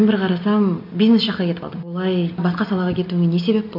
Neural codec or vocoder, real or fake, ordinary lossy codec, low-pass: none; real; none; 5.4 kHz